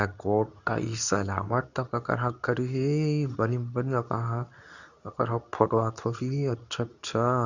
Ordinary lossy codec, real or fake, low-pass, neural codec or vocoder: none; fake; 7.2 kHz; codec, 24 kHz, 0.9 kbps, WavTokenizer, medium speech release version 2